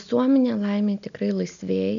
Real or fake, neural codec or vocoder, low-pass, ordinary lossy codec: real; none; 7.2 kHz; MP3, 96 kbps